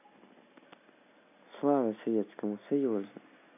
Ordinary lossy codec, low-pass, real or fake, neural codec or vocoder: none; 3.6 kHz; real; none